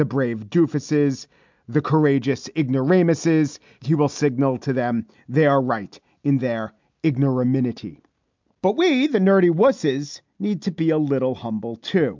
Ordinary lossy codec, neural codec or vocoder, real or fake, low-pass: MP3, 64 kbps; none; real; 7.2 kHz